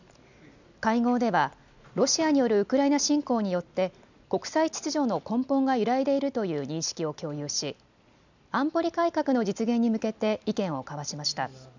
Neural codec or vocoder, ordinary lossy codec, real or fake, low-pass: none; none; real; 7.2 kHz